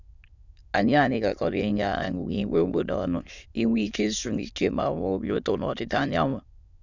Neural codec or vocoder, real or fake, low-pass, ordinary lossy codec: autoencoder, 22.05 kHz, a latent of 192 numbers a frame, VITS, trained on many speakers; fake; 7.2 kHz; none